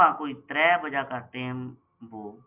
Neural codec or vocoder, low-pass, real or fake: none; 3.6 kHz; real